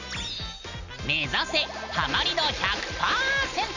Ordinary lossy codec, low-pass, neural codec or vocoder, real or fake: none; 7.2 kHz; none; real